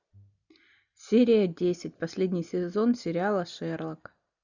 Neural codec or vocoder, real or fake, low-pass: none; real; 7.2 kHz